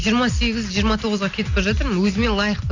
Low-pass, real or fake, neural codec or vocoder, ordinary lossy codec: 7.2 kHz; real; none; AAC, 48 kbps